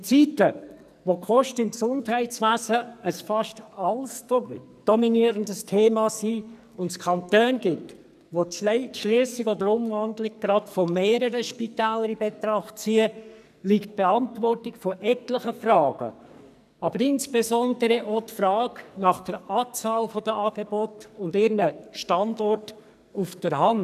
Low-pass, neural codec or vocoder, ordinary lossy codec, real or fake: 14.4 kHz; codec, 44.1 kHz, 2.6 kbps, SNAC; none; fake